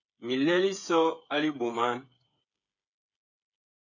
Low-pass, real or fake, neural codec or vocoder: 7.2 kHz; fake; codec, 16 kHz, 8 kbps, FreqCodec, smaller model